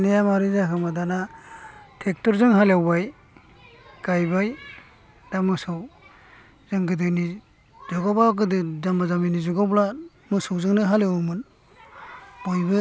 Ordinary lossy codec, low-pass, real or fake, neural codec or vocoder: none; none; real; none